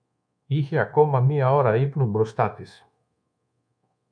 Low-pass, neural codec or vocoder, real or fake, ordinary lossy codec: 9.9 kHz; codec, 24 kHz, 1.2 kbps, DualCodec; fake; MP3, 64 kbps